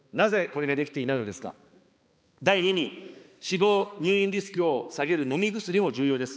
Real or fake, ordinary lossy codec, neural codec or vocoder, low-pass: fake; none; codec, 16 kHz, 2 kbps, X-Codec, HuBERT features, trained on balanced general audio; none